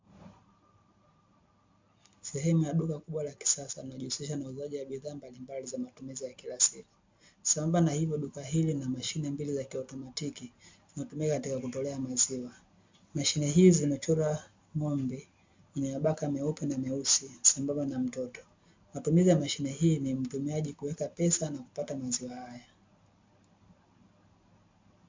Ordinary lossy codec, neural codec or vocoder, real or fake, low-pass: MP3, 64 kbps; none; real; 7.2 kHz